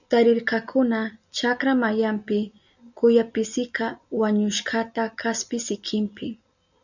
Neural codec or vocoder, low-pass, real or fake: none; 7.2 kHz; real